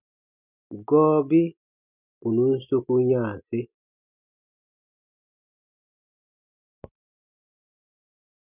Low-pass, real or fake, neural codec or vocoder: 3.6 kHz; real; none